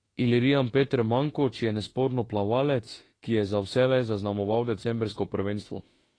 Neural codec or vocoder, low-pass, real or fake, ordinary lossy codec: autoencoder, 48 kHz, 32 numbers a frame, DAC-VAE, trained on Japanese speech; 9.9 kHz; fake; AAC, 32 kbps